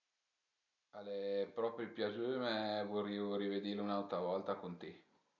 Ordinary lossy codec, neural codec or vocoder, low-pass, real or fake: none; none; 7.2 kHz; real